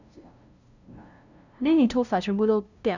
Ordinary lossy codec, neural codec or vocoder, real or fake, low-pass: none; codec, 16 kHz, 0.5 kbps, FunCodec, trained on LibriTTS, 25 frames a second; fake; 7.2 kHz